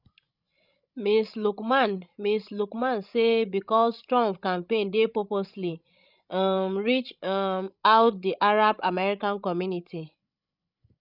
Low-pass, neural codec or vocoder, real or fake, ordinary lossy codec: 5.4 kHz; codec, 16 kHz, 16 kbps, FreqCodec, larger model; fake; none